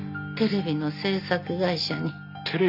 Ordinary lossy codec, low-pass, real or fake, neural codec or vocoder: none; 5.4 kHz; real; none